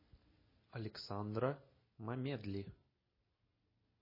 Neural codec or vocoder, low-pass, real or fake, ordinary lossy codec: none; 5.4 kHz; real; MP3, 24 kbps